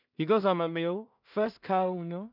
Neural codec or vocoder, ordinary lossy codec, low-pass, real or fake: codec, 16 kHz in and 24 kHz out, 0.4 kbps, LongCat-Audio-Codec, two codebook decoder; none; 5.4 kHz; fake